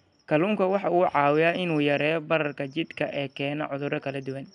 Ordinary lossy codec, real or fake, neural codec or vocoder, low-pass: MP3, 96 kbps; real; none; 9.9 kHz